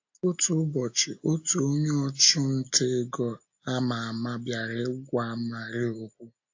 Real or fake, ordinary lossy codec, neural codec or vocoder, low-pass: real; AAC, 48 kbps; none; 7.2 kHz